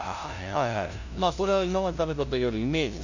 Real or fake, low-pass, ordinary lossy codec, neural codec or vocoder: fake; 7.2 kHz; none; codec, 16 kHz, 0.5 kbps, FunCodec, trained on LibriTTS, 25 frames a second